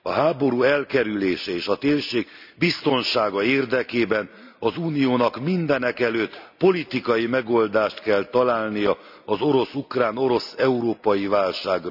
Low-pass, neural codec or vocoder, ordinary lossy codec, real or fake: 5.4 kHz; none; none; real